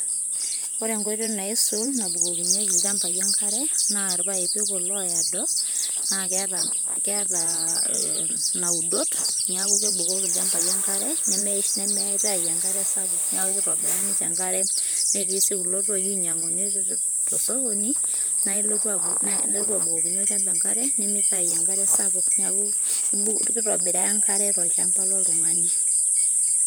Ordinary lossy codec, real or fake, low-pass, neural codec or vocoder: none; fake; none; vocoder, 44.1 kHz, 128 mel bands, Pupu-Vocoder